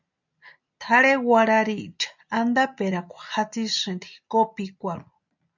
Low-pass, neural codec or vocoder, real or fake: 7.2 kHz; none; real